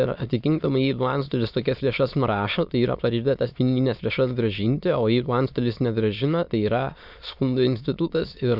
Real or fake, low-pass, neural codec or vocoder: fake; 5.4 kHz; autoencoder, 22.05 kHz, a latent of 192 numbers a frame, VITS, trained on many speakers